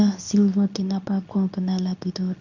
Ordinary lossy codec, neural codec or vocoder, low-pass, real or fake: none; codec, 24 kHz, 0.9 kbps, WavTokenizer, medium speech release version 2; 7.2 kHz; fake